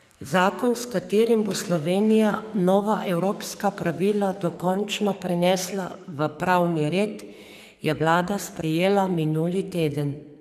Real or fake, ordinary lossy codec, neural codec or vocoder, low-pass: fake; none; codec, 44.1 kHz, 2.6 kbps, SNAC; 14.4 kHz